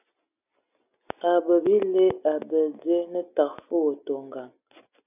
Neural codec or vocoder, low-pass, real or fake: none; 3.6 kHz; real